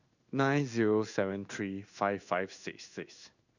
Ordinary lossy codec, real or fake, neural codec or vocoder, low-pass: none; fake; codec, 16 kHz in and 24 kHz out, 1 kbps, XY-Tokenizer; 7.2 kHz